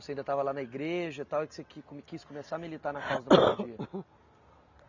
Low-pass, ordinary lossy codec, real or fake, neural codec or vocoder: 7.2 kHz; none; real; none